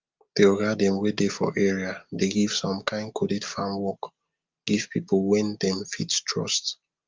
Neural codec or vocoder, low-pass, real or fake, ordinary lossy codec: none; 7.2 kHz; real; Opus, 32 kbps